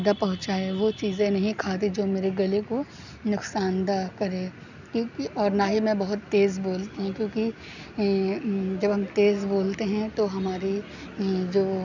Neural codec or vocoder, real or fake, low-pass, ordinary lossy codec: none; real; 7.2 kHz; none